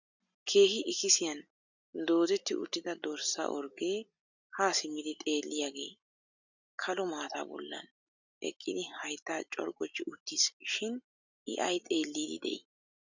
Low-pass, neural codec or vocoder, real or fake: 7.2 kHz; none; real